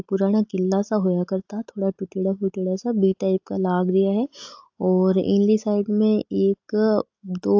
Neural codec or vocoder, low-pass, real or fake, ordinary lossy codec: none; 7.2 kHz; real; AAC, 48 kbps